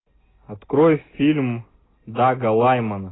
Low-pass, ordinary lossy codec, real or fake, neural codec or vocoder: 7.2 kHz; AAC, 16 kbps; real; none